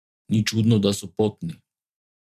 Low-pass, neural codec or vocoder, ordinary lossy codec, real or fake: 14.4 kHz; vocoder, 44.1 kHz, 128 mel bands every 512 samples, BigVGAN v2; none; fake